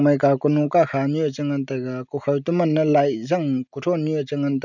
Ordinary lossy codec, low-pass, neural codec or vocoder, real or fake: none; 7.2 kHz; none; real